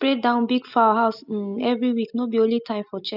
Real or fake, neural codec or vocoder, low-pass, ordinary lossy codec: real; none; 5.4 kHz; none